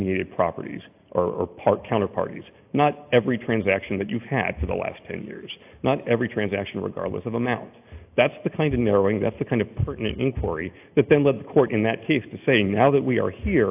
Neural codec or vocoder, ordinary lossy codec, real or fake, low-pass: none; AAC, 32 kbps; real; 3.6 kHz